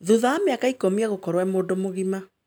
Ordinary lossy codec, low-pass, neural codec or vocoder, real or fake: none; none; none; real